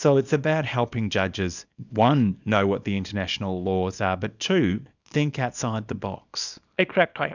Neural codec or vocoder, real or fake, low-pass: codec, 24 kHz, 0.9 kbps, WavTokenizer, small release; fake; 7.2 kHz